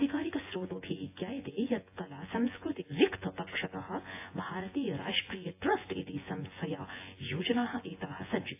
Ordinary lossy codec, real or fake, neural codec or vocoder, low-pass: AAC, 24 kbps; fake; vocoder, 24 kHz, 100 mel bands, Vocos; 3.6 kHz